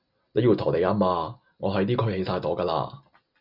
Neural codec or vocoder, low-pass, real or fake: none; 5.4 kHz; real